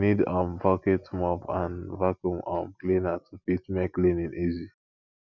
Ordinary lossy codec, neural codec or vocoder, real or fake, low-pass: none; none; real; 7.2 kHz